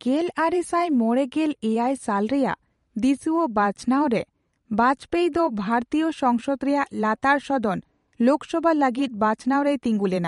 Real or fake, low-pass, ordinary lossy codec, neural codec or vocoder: fake; 19.8 kHz; MP3, 48 kbps; vocoder, 44.1 kHz, 128 mel bands every 512 samples, BigVGAN v2